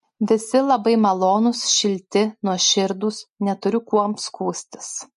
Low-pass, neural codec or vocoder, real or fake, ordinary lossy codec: 14.4 kHz; none; real; MP3, 48 kbps